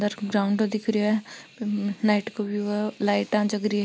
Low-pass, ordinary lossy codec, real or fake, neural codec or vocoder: none; none; real; none